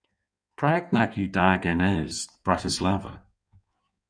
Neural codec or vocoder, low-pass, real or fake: codec, 16 kHz in and 24 kHz out, 1.1 kbps, FireRedTTS-2 codec; 9.9 kHz; fake